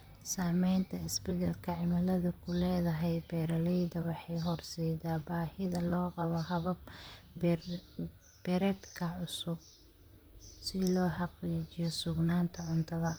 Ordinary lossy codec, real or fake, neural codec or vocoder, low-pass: none; fake; vocoder, 44.1 kHz, 128 mel bands, Pupu-Vocoder; none